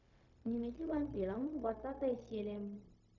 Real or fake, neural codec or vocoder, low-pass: fake; codec, 16 kHz, 0.4 kbps, LongCat-Audio-Codec; 7.2 kHz